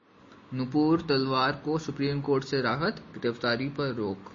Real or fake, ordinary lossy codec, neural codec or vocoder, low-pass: real; MP3, 32 kbps; none; 7.2 kHz